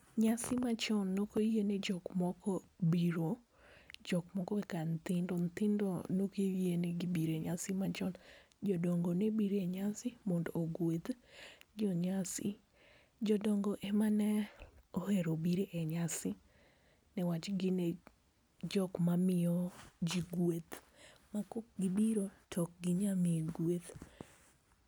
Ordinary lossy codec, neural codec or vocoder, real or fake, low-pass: none; none; real; none